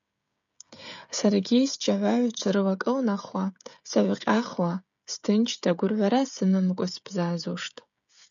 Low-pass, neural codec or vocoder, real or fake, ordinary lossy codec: 7.2 kHz; codec, 16 kHz, 16 kbps, FreqCodec, smaller model; fake; MP3, 96 kbps